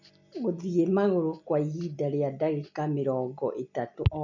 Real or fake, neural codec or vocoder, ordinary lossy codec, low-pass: real; none; none; 7.2 kHz